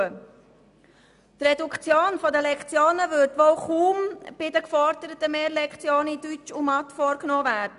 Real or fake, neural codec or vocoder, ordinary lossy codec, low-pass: real; none; MP3, 96 kbps; 10.8 kHz